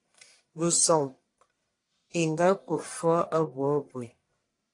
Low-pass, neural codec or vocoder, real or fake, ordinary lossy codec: 10.8 kHz; codec, 44.1 kHz, 1.7 kbps, Pupu-Codec; fake; AAC, 48 kbps